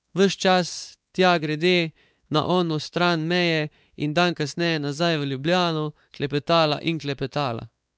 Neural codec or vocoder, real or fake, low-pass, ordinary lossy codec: codec, 16 kHz, 4 kbps, X-Codec, WavLM features, trained on Multilingual LibriSpeech; fake; none; none